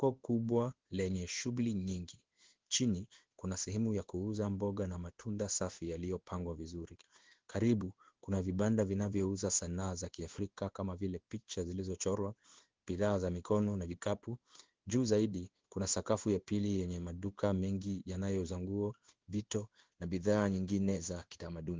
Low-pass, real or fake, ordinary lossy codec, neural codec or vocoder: 7.2 kHz; fake; Opus, 16 kbps; codec, 16 kHz in and 24 kHz out, 1 kbps, XY-Tokenizer